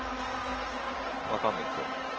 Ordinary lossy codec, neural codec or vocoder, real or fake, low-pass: Opus, 16 kbps; none; real; 7.2 kHz